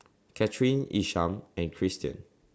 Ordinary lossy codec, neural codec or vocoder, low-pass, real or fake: none; none; none; real